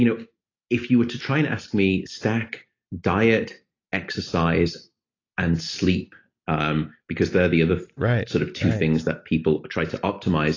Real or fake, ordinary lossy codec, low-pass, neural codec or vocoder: real; AAC, 32 kbps; 7.2 kHz; none